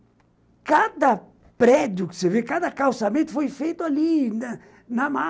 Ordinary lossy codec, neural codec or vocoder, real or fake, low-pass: none; none; real; none